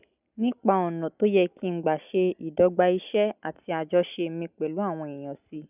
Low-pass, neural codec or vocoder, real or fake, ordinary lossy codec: 3.6 kHz; none; real; none